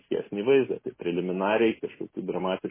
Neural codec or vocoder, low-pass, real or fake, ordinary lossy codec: none; 3.6 kHz; real; MP3, 16 kbps